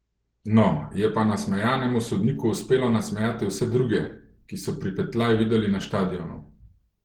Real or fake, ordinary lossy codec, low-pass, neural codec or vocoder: real; Opus, 16 kbps; 19.8 kHz; none